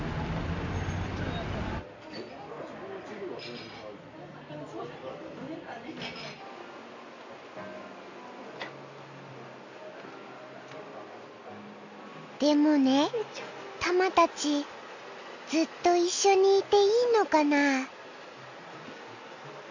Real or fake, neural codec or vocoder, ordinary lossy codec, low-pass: real; none; AAC, 48 kbps; 7.2 kHz